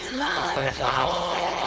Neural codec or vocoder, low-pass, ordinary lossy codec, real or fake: codec, 16 kHz, 4.8 kbps, FACodec; none; none; fake